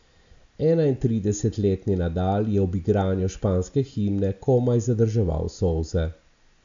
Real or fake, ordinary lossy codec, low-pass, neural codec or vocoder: real; AAC, 64 kbps; 7.2 kHz; none